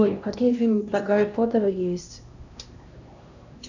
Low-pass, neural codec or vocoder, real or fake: 7.2 kHz; codec, 16 kHz, 1 kbps, X-Codec, HuBERT features, trained on LibriSpeech; fake